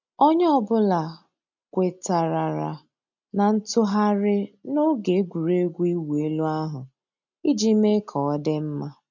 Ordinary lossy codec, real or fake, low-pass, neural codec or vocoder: none; real; 7.2 kHz; none